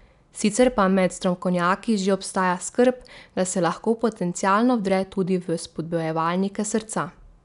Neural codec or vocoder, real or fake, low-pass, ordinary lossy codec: none; real; 10.8 kHz; none